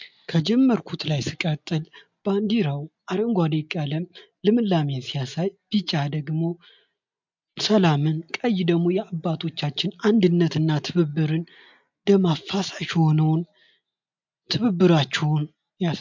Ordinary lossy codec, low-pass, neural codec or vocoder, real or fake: MP3, 64 kbps; 7.2 kHz; none; real